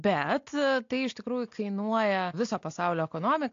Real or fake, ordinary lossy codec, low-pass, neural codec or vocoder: real; AAC, 48 kbps; 7.2 kHz; none